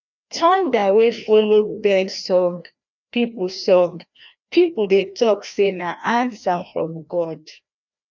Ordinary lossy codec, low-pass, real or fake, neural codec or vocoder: none; 7.2 kHz; fake; codec, 16 kHz, 1 kbps, FreqCodec, larger model